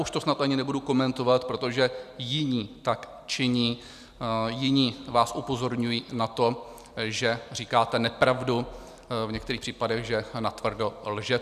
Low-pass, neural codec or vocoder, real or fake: 14.4 kHz; none; real